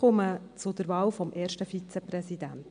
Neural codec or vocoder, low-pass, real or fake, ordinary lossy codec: none; 9.9 kHz; real; MP3, 64 kbps